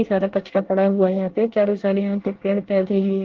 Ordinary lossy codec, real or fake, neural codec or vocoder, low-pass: Opus, 16 kbps; fake; codec, 24 kHz, 1 kbps, SNAC; 7.2 kHz